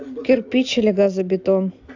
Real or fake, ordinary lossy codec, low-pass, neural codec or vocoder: real; AAC, 48 kbps; 7.2 kHz; none